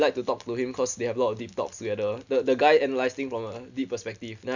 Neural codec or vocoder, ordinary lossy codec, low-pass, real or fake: none; none; 7.2 kHz; real